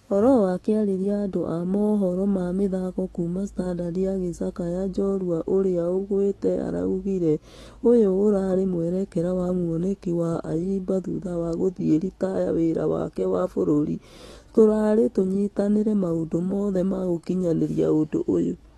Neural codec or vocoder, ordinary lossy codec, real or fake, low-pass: autoencoder, 48 kHz, 128 numbers a frame, DAC-VAE, trained on Japanese speech; AAC, 32 kbps; fake; 19.8 kHz